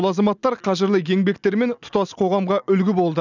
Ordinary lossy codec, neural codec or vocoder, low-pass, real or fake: none; none; 7.2 kHz; real